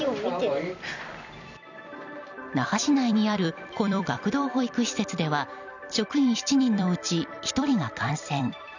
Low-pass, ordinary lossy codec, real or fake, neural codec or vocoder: 7.2 kHz; none; fake; vocoder, 44.1 kHz, 128 mel bands every 256 samples, BigVGAN v2